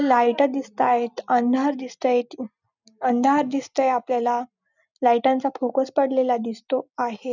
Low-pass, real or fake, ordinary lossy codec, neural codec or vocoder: 7.2 kHz; real; none; none